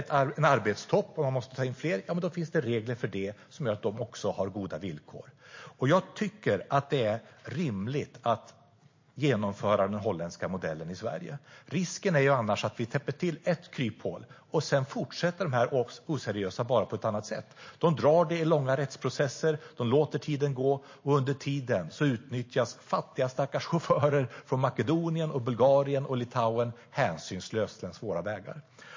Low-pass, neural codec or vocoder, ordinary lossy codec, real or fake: 7.2 kHz; none; MP3, 32 kbps; real